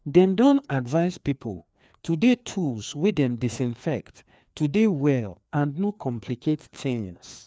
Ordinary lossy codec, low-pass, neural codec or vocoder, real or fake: none; none; codec, 16 kHz, 2 kbps, FreqCodec, larger model; fake